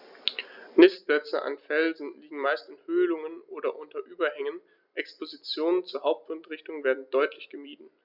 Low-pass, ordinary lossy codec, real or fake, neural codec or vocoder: 5.4 kHz; Opus, 64 kbps; real; none